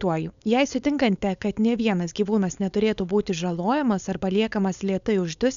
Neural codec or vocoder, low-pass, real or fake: codec, 16 kHz, 4.8 kbps, FACodec; 7.2 kHz; fake